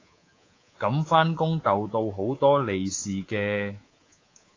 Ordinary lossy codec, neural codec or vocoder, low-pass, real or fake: AAC, 32 kbps; codec, 24 kHz, 3.1 kbps, DualCodec; 7.2 kHz; fake